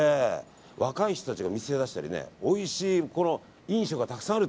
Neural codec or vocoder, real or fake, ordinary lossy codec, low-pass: none; real; none; none